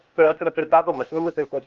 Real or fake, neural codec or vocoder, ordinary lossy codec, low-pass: fake; codec, 16 kHz, 0.8 kbps, ZipCodec; Opus, 32 kbps; 7.2 kHz